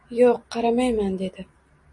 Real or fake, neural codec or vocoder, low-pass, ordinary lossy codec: real; none; 10.8 kHz; MP3, 48 kbps